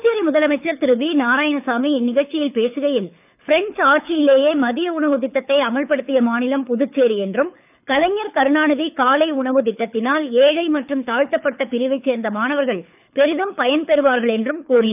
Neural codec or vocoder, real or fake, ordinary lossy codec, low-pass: codec, 24 kHz, 6 kbps, HILCodec; fake; none; 3.6 kHz